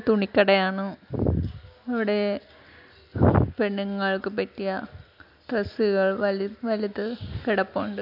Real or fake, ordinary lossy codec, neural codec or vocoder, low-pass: real; none; none; 5.4 kHz